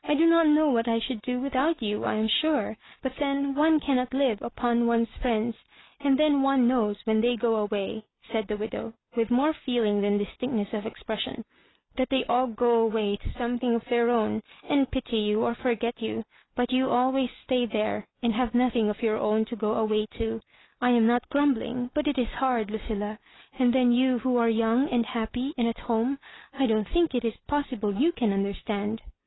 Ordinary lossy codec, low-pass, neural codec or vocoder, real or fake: AAC, 16 kbps; 7.2 kHz; none; real